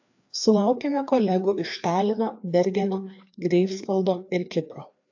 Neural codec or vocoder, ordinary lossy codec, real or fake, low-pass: codec, 16 kHz, 2 kbps, FreqCodec, larger model; AAC, 48 kbps; fake; 7.2 kHz